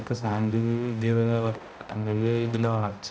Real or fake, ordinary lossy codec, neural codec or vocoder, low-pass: fake; none; codec, 16 kHz, 0.5 kbps, X-Codec, HuBERT features, trained on balanced general audio; none